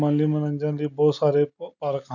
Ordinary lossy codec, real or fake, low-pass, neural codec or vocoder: none; real; 7.2 kHz; none